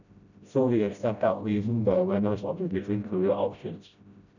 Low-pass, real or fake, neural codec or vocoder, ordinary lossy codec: 7.2 kHz; fake; codec, 16 kHz, 0.5 kbps, FreqCodec, smaller model; none